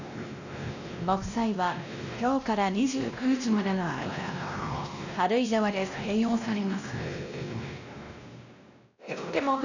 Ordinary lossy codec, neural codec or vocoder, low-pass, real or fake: none; codec, 16 kHz, 1 kbps, X-Codec, WavLM features, trained on Multilingual LibriSpeech; 7.2 kHz; fake